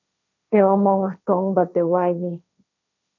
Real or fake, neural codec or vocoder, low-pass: fake; codec, 16 kHz, 1.1 kbps, Voila-Tokenizer; 7.2 kHz